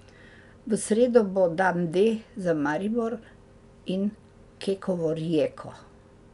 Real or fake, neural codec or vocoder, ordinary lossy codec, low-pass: real; none; none; 10.8 kHz